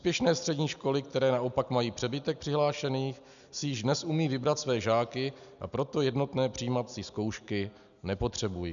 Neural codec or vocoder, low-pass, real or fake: none; 7.2 kHz; real